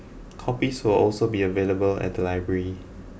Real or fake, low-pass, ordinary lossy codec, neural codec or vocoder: real; none; none; none